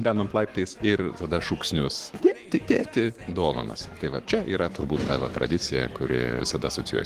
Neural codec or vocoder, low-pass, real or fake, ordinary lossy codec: codec, 44.1 kHz, 7.8 kbps, Pupu-Codec; 14.4 kHz; fake; Opus, 24 kbps